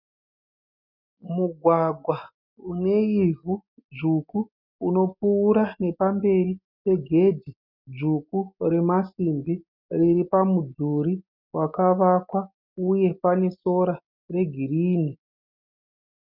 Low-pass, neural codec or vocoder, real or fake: 5.4 kHz; none; real